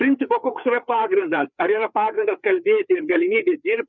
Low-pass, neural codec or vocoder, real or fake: 7.2 kHz; codec, 16 kHz, 4 kbps, FreqCodec, larger model; fake